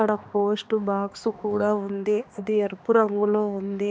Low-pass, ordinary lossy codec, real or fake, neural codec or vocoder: none; none; fake; codec, 16 kHz, 2 kbps, X-Codec, HuBERT features, trained on balanced general audio